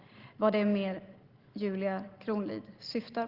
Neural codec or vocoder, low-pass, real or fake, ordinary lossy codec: none; 5.4 kHz; real; Opus, 16 kbps